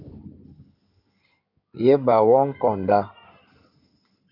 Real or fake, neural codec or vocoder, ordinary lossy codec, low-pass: fake; codec, 16 kHz in and 24 kHz out, 2.2 kbps, FireRedTTS-2 codec; MP3, 48 kbps; 5.4 kHz